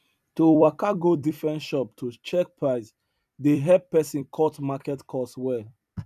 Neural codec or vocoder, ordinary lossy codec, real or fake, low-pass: vocoder, 44.1 kHz, 128 mel bands every 256 samples, BigVGAN v2; none; fake; 14.4 kHz